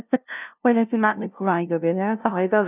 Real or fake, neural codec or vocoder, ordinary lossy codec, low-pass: fake; codec, 16 kHz, 0.5 kbps, FunCodec, trained on LibriTTS, 25 frames a second; none; 3.6 kHz